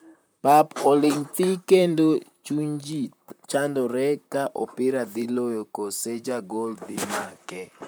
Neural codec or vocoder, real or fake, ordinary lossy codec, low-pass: vocoder, 44.1 kHz, 128 mel bands, Pupu-Vocoder; fake; none; none